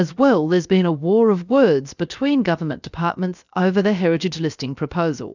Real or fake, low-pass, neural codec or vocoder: fake; 7.2 kHz; codec, 16 kHz, 0.7 kbps, FocalCodec